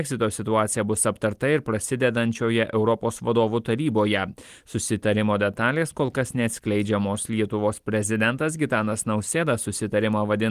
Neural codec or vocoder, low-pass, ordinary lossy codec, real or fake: none; 14.4 kHz; Opus, 24 kbps; real